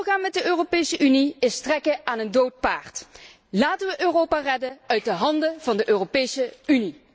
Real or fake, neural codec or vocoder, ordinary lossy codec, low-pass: real; none; none; none